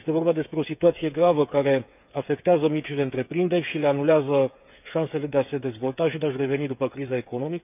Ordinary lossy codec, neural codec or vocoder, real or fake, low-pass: none; codec, 16 kHz, 8 kbps, FreqCodec, smaller model; fake; 3.6 kHz